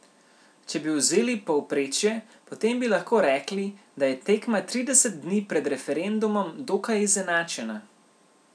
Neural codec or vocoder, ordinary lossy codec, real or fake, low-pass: none; none; real; none